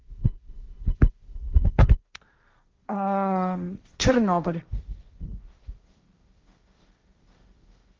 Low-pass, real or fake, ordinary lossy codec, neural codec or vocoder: 7.2 kHz; fake; Opus, 32 kbps; codec, 16 kHz, 1.1 kbps, Voila-Tokenizer